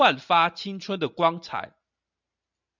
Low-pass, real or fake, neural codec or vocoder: 7.2 kHz; real; none